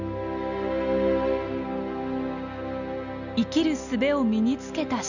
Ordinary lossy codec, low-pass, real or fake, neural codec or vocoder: none; 7.2 kHz; real; none